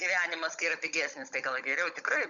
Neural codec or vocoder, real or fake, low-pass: codec, 16 kHz, 16 kbps, FunCodec, trained on Chinese and English, 50 frames a second; fake; 7.2 kHz